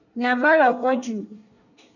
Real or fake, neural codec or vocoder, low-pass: fake; codec, 24 kHz, 1 kbps, SNAC; 7.2 kHz